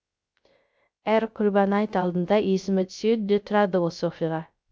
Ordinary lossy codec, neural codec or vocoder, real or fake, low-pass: none; codec, 16 kHz, 0.3 kbps, FocalCodec; fake; none